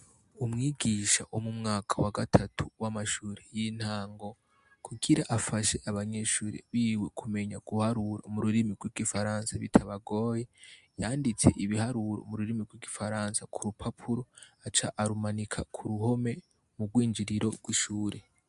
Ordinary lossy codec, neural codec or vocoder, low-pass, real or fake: MP3, 64 kbps; none; 10.8 kHz; real